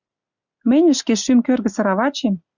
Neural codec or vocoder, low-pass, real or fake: none; 7.2 kHz; real